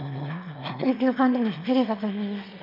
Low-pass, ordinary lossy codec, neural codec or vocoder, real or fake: 5.4 kHz; MP3, 48 kbps; autoencoder, 22.05 kHz, a latent of 192 numbers a frame, VITS, trained on one speaker; fake